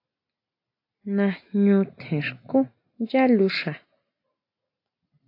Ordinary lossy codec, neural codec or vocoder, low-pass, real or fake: AAC, 32 kbps; none; 5.4 kHz; real